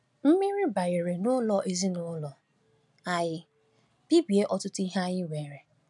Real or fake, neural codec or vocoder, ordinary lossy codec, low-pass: real; none; none; 10.8 kHz